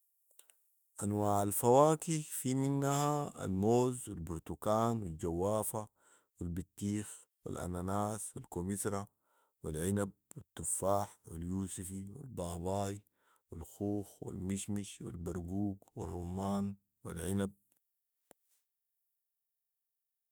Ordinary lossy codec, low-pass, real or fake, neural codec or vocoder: none; none; fake; autoencoder, 48 kHz, 32 numbers a frame, DAC-VAE, trained on Japanese speech